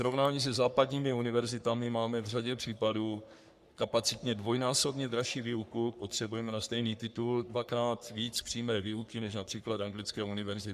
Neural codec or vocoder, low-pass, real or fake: codec, 44.1 kHz, 3.4 kbps, Pupu-Codec; 14.4 kHz; fake